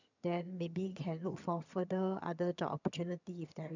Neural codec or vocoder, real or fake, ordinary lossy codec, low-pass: vocoder, 22.05 kHz, 80 mel bands, HiFi-GAN; fake; none; 7.2 kHz